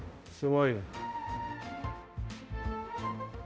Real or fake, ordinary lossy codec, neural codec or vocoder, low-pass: fake; none; codec, 16 kHz, 0.5 kbps, X-Codec, HuBERT features, trained on balanced general audio; none